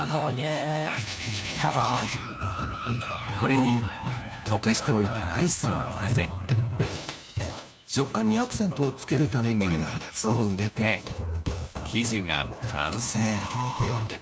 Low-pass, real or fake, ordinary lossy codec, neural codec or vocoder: none; fake; none; codec, 16 kHz, 1 kbps, FunCodec, trained on LibriTTS, 50 frames a second